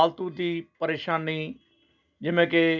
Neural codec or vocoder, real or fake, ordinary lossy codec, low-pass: none; real; none; 7.2 kHz